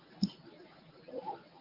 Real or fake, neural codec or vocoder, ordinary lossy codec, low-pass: fake; codec, 24 kHz, 0.9 kbps, WavTokenizer, medium speech release version 1; Opus, 24 kbps; 5.4 kHz